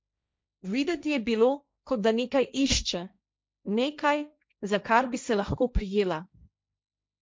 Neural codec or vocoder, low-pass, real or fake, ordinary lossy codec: codec, 16 kHz, 1.1 kbps, Voila-Tokenizer; none; fake; none